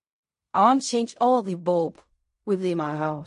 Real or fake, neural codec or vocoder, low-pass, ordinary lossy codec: fake; codec, 16 kHz in and 24 kHz out, 0.4 kbps, LongCat-Audio-Codec, fine tuned four codebook decoder; 10.8 kHz; MP3, 48 kbps